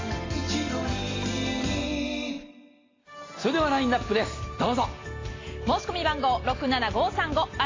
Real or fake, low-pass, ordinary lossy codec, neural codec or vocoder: real; 7.2 kHz; AAC, 32 kbps; none